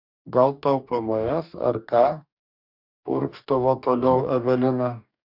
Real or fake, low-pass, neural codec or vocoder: fake; 5.4 kHz; codec, 44.1 kHz, 2.6 kbps, DAC